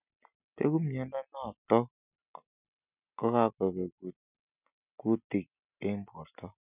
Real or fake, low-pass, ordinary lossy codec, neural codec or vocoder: real; 3.6 kHz; none; none